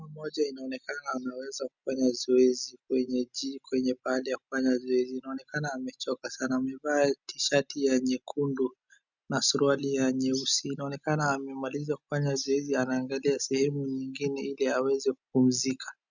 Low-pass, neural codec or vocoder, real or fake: 7.2 kHz; none; real